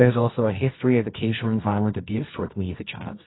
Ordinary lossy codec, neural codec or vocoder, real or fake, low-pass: AAC, 16 kbps; codec, 24 kHz, 0.9 kbps, WavTokenizer, medium music audio release; fake; 7.2 kHz